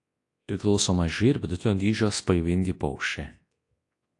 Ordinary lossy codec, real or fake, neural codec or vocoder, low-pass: AAC, 48 kbps; fake; codec, 24 kHz, 0.9 kbps, WavTokenizer, large speech release; 10.8 kHz